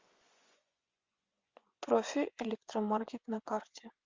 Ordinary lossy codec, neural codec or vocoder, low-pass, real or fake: Opus, 32 kbps; vocoder, 24 kHz, 100 mel bands, Vocos; 7.2 kHz; fake